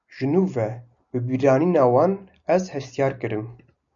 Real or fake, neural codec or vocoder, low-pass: real; none; 7.2 kHz